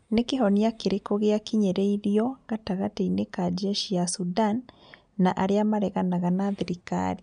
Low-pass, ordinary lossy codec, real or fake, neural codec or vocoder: 9.9 kHz; none; real; none